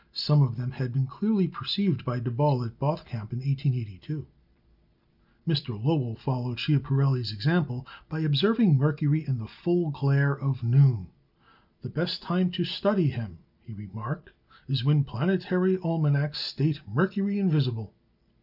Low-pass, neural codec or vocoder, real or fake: 5.4 kHz; none; real